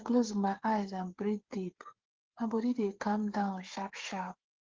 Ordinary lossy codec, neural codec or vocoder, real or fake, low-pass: Opus, 16 kbps; codec, 16 kHz, 8 kbps, FreqCodec, smaller model; fake; 7.2 kHz